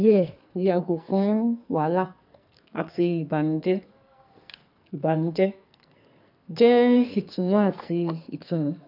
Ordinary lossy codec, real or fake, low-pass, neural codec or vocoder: none; fake; 5.4 kHz; codec, 44.1 kHz, 2.6 kbps, SNAC